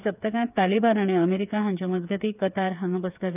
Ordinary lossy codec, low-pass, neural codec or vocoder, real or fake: none; 3.6 kHz; codec, 16 kHz, 8 kbps, FreqCodec, smaller model; fake